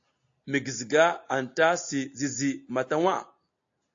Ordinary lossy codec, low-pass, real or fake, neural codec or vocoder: AAC, 48 kbps; 7.2 kHz; real; none